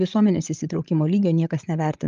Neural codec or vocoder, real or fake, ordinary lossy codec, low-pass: codec, 16 kHz, 16 kbps, FreqCodec, larger model; fake; Opus, 32 kbps; 7.2 kHz